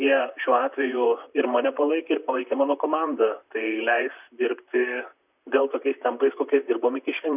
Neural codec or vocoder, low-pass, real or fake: vocoder, 44.1 kHz, 128 mel bands, Pupu-Vocoder; 3.6 kHz; fake